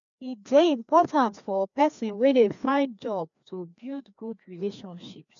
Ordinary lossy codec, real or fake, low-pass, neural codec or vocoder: none; fake; 7.2 kHz; codec, 16 kHz, 2 kbps, FreqCodec, larger model